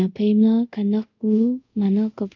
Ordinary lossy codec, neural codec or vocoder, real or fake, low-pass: none; codec, 24 kHz, 0.5 kbps, DualCodec; fake; 7.2 kHz